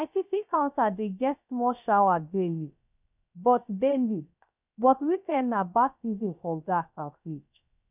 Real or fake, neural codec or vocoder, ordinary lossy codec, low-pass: fake; codec, 16 kHz, 0.3 kbps, FocalCodec; none; 3.6 kHz